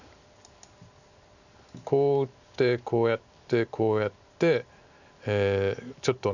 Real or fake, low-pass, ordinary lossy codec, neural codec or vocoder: real; 7.2 kHz; none; none